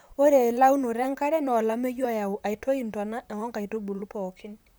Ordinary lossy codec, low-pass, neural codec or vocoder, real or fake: none; none; vocoder, 44.1 kHz, 128 mel bands, Pupu-Vocoder; fake